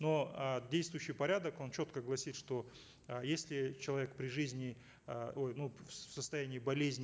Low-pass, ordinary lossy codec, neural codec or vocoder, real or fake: none; none; none; real